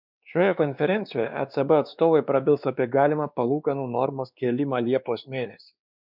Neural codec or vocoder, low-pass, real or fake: codec, 16 kHz, 2 kbps, X-Codec, WavLM features, trained on Multilingual LibriSpeech; 5.4 kHz; fake